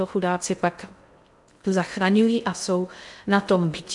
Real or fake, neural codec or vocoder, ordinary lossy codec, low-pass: fake; codec, 16 kHz in and 24 kHz out, 0.6 kbps, FocalCodec, streaming, 2048 codes; MP3, 96 kbps; 10.8 kHz